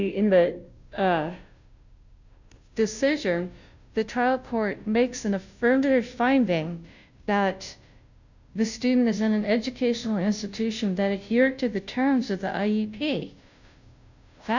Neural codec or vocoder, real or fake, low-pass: codec, 16 kHz, 0.5 kbps, FunCodec, trained on Chinese and English, 25 frames a second; fake; 7.2 kHz